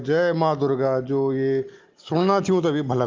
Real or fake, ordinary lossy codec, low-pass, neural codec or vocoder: real; Opus, 32 kbps; 7.2 kHz; none